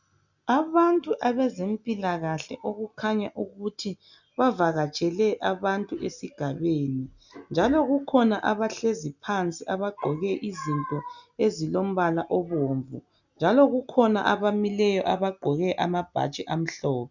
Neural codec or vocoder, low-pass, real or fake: none; 7.2 kHz; real